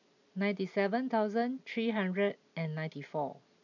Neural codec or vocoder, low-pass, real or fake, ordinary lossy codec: none; 7.2 kHz; real; none